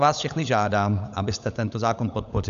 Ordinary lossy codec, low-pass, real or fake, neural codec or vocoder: AAC, 96 kbps; 7.2 kHz; fake; codec, 16 kHz, 16 kbps, FunCodec, trained on LibriTTS, 50 frames a second